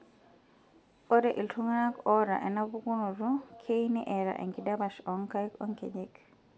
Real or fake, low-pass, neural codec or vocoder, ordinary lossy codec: real; none; none; none